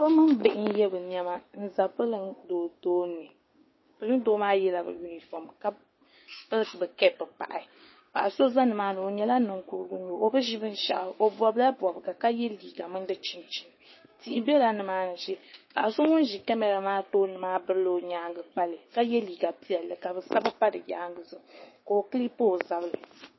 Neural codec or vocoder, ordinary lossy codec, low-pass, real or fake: codec, 16 kHz, 6 kbps, DAC; MP3, 24 kbps; 7.2 kHz; fake